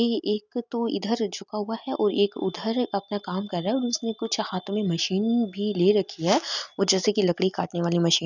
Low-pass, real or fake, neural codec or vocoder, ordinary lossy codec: 7.2 kHz; real; none; none